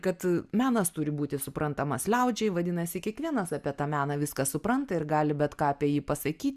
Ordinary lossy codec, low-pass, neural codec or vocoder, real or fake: Opus, 64 kbps; 14.4 kHz; none; real